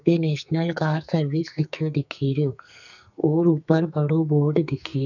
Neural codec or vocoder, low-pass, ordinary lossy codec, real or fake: codec, 44.1 kHz, 2.6 kbps, SNAC; 7.2 kHz; none; fake